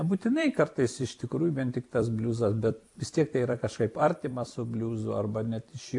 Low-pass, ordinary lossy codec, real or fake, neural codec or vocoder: 10.8 kHz; AAC, 64 kbps; fake; vocoder, 44.1 kHz, 128 mel bands every 256 samples, BigVGAN v2